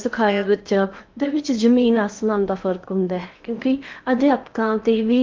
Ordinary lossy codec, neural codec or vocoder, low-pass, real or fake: Opus, 24 kbps; codec, 16 kHz in and 24 kHz out, 0.8 kbps, FocalCodec, streaming, 65536 codes; 7.2 kHz; fake